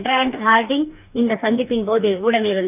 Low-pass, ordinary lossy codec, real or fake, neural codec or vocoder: 3.6 kHz; none; fake; codec, 44.1 kHz, 2.6 kbps, DAC